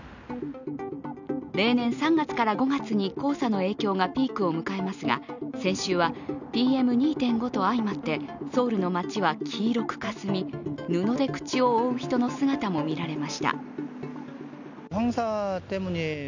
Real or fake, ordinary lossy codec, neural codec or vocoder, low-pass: real; none; none; 7.2 kHz